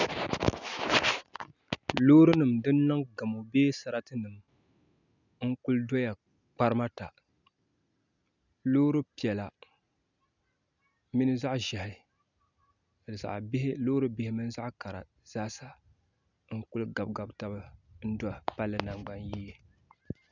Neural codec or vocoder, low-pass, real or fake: none; 7.2 kHz; real